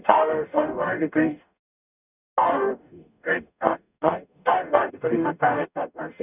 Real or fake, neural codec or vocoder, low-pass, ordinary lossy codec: fake; codec, 44.1 kHz, 0.9 kbps, DAC; 3.6 kHz; none